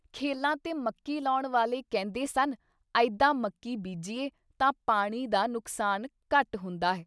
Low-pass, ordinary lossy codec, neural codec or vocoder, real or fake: none; none; none; real